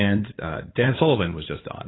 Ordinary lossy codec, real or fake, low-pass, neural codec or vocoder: AAC, 16 kbps; fake; 7.2 kHz; codec, 16 kHz in and 24 kHz out, 2.2 kbps, FireRedTTS-2 codec